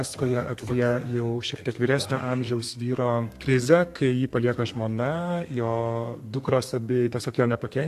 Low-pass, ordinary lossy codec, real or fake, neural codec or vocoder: 14.4 kHz; AAC, 64 kbps; fake; codec, 32 kHz, 1.9 kbps, SNAC